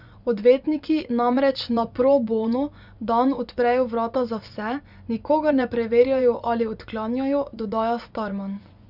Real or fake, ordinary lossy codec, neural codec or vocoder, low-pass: fake; none; vocoder, 24 kHz, 100 mel bands, Vocos; 5.4 kHz